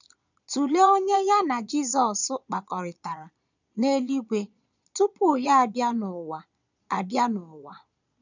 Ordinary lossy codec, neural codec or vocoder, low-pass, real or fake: none; vocoder, 22.05 kHz, 80 mel bands, Vocos; 7.2 kHz; fake